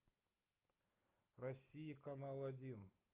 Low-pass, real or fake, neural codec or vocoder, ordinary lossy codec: 3.6 kHz; real; none; Opus, 32 kbps